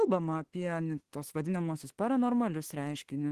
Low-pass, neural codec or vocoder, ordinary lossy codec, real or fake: 14.4 kHz; autoencoder, 48 kHz, 32 numbers a frame, DAC-VAE, trained on Japanese speech; Opus, 16 kbps; fake